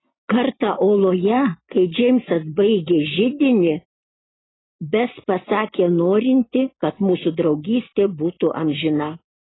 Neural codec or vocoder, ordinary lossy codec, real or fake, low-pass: none; AAC, 16 kbps; real; 7.2 kHz